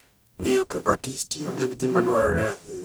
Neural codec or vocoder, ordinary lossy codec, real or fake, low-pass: codec, 44.1 kHz, 0.9 kbps, DAC; none; fake; none